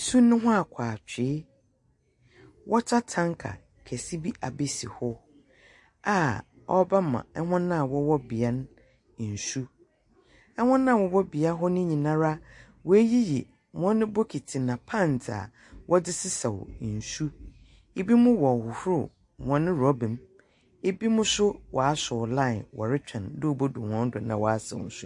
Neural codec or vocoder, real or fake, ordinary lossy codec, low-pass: none; real; MP3, 48 kbps; 10.8 kHz